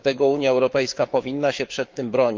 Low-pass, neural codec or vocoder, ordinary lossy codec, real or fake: 7.2 kHz; codec, 16 kHz, 6 kbps, DAC; Opus, 24 kbps; fake